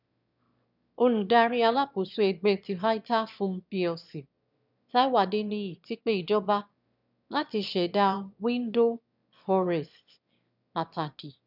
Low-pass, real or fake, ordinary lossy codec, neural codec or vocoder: 5.4 kHz; fake; none; autoencoder, 22.05 kHz, a latent of 192 numbers a frame, VITS, trained on one speaker